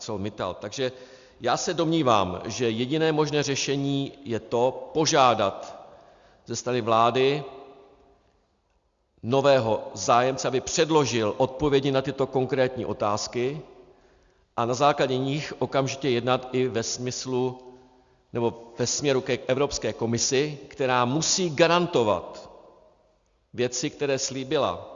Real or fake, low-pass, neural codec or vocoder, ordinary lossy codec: real; 7.2 kHz; none; Opus, 64 kbps